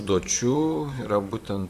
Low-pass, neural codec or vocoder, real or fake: 14.4 kHz; vocoder, 44.1 kHz, 128 mel bands, Pupu-Vocoder; fake